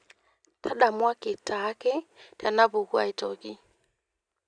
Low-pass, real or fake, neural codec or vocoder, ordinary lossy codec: 9.9 kHz; real; none; none